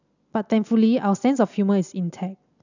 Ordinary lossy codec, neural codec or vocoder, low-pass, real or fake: none; none; 7.2 kHz; real